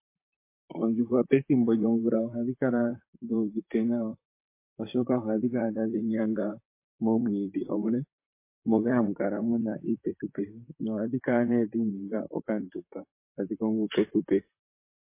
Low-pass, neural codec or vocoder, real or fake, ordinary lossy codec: 3.6 kHz; vocoder, 44.1 kHz, 128 mel bands, Pupu-Vocoder; fake; MP3, 24 kbps